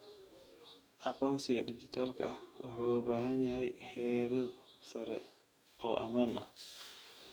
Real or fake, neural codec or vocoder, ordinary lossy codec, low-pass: fake; codec, 44.1 kHz, 2.6 kbps, DAC; none; none